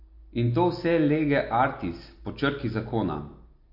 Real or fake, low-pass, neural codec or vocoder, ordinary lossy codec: real; 5.4 kHz; none; MP3, 32 kbps